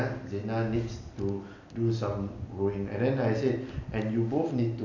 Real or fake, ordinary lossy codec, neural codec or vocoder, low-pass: real; none; none; 7.2 kHz